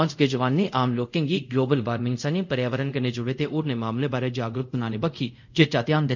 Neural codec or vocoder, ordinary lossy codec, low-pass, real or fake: codec, 24 kHz, 0.5 kbps, DualCodec; none; 7.2 kHz; fake